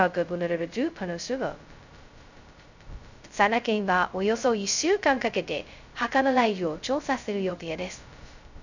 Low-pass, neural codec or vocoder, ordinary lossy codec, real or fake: 7.2 kHz; codec, 16 kHz, 0.2 kbps, FocalCodec; none; fake